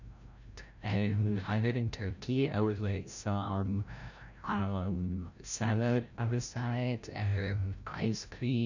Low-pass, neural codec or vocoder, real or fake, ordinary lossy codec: 7.2 kHz; codec, 16 kHz, 0.5 kbps, FreqCodec, larger model; fake; none